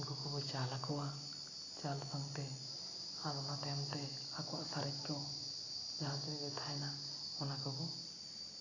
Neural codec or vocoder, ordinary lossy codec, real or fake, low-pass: none; AAC, 32 kbps; real; 7.2 kHz